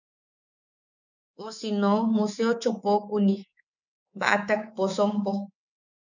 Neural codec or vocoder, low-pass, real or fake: codec, 24 kHz, 3.1 kbps, DualCodec; 7.2 kHz; fake